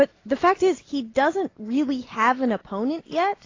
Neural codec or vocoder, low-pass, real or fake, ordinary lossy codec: none; 7.2 kHz; real; AAC, 32 kbps